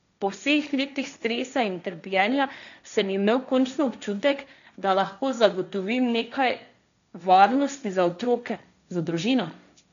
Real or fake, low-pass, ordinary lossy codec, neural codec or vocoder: fake; 7.2 kHz; none; codec, 16 kHz, 1.1 kbps, Voila-Tokenizer